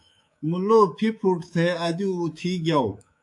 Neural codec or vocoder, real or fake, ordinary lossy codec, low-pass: codec, 24 kHz, 3.1 kbps, DualCodec; fake; AAC, 64 kbps; 10.8 kHz